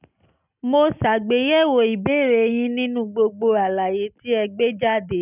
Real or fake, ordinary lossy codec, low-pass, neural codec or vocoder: real; none; 3.6 kHz; none